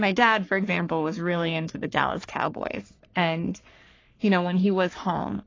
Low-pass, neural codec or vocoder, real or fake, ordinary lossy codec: 7.2 kHz; codec, 44.1 kHz, 3.4 kbps, Pupu-Codec; fake; AAC, 32 kbps